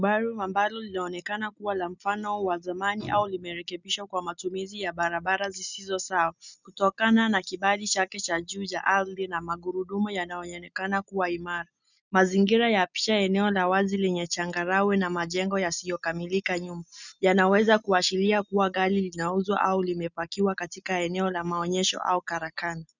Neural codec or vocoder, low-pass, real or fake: none; 7.2 kHz; real